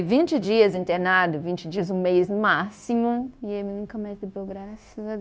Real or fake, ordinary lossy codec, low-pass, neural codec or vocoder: fake; none; none; codec, 16 kHz, 0.9 kbps, LongCat-Audio-Codec